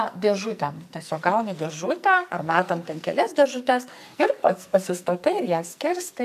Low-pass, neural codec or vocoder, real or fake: 14.4 kHz; codec, 44.1 kHz, 2.6 kbps, SNAC; fake